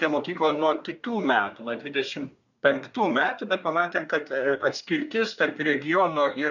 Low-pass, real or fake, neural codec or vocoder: 7.2 kHz; fake; codec, 24 kHz, 1 kbps, SNAC